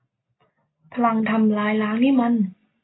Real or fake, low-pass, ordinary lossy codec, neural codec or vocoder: real; 7.2 kHz; AAC, 16 kbps; none